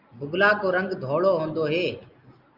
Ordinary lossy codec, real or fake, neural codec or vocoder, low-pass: Opus, 24 kbps; real; none; 5.4 kHz